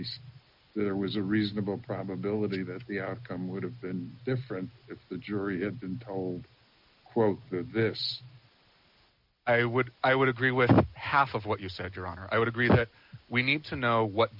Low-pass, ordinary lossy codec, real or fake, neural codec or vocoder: 5.4 kHz; MP3, 48 kbps; real; none